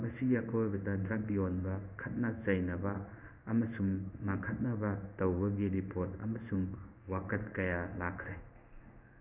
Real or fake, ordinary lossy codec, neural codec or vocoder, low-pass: fake; none; codec, 16 kHz in and 24 kHz out, 1 kbps, XY-Tokenizer; 3.6 kHz